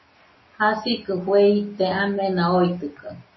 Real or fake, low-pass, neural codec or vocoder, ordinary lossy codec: real; 7.2 kHz; none; MP3, 24 kbps